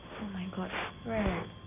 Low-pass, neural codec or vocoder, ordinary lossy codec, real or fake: 3.6 kHz; none; none; real